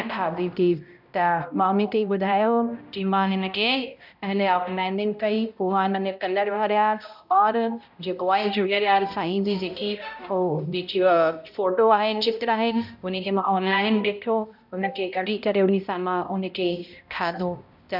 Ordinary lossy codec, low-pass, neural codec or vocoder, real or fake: none; 5.4 kHz; codec, 16 kHz, 0.5 kbps, X-Codec, HuBERT features, trained on balanced general audio; fake